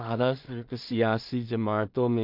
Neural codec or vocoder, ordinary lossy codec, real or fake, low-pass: codec, 16 kHz in and 24 kHz out, 0.4 kbps, LongCat-Audio-Codec, two codebook decoder; MP3, 48 kbps; fake; 5.4 kHz